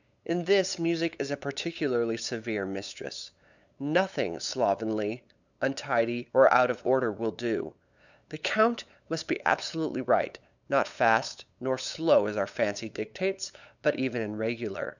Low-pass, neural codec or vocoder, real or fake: 7.2 kHz; codec, 16 kHz, 8 kbps, FunCodec, trained on LibriTTS, 25 frames a second; fake